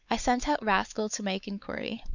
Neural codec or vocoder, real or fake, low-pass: codec, 16 kHz, 4 kbps, X-Codec, WavLM features, trained on Multilingual LibriSpeech; fake; 7.2 kHz